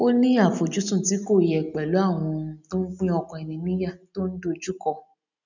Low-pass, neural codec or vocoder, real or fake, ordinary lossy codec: 7.2 kHz; none; real; none